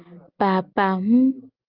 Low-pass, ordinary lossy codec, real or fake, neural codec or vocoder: 5.4 kHz; Opus, 32 kbps; real; none